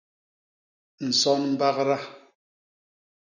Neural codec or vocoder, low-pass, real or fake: none; 7.2 kHz; real